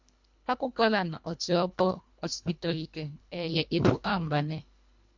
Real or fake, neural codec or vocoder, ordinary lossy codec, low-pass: fake; codec, 24 kHz, 1.5 kbps, HILCodec; MP3, 64 kbps; 7.2 kHz